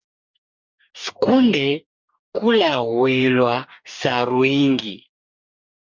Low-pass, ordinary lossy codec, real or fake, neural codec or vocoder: 7.2 kHz; MP3, 64 kbps; fake; codec, 44.1 kHz, 2.6 kbps, DAC